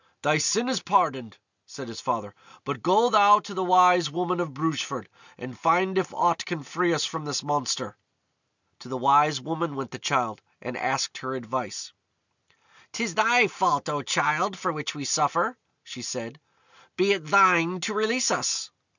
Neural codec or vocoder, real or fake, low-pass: none; real; 7.2 kHz